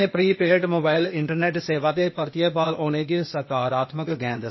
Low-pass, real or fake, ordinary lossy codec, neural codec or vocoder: 7.2 kHz; fake; MP3, 24 kbps; codec, 16 kHz, 0.8 kbps, ZipCodec